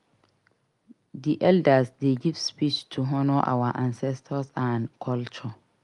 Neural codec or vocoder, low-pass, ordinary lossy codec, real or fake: none; 10.8 kHz; Opus, 32 kbps; real